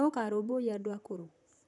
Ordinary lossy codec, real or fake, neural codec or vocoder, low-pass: none; fake; vocoder, 44.1 kHz, 128 mel bands, Pupu-Vocoder; 10.8 kHz